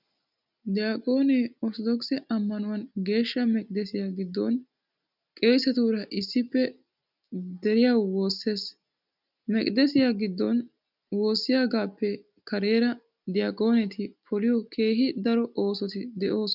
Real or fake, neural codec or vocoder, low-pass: real; none; 5.4 kHz